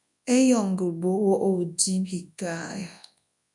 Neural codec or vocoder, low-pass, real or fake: codec, 24 kHz, 0.9 kbps, WavTokenizer, large speech release; 10.8 kHz; fake